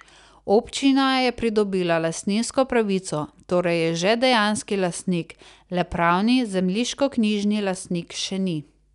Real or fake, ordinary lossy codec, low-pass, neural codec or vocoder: real; none; 10.8 kHz; none